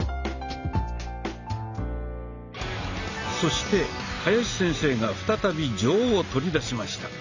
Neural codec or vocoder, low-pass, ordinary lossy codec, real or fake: none; 7.2 kHz; none; real